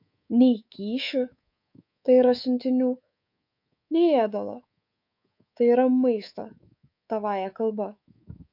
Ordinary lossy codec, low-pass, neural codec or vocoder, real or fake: MP3, 48 kbps; 5.4 kHz; codec, 24 kHz, 3.1 kbps, DualCodec; fake